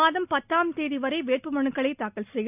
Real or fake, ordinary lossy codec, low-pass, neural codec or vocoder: real; none; 3.6 kHz; none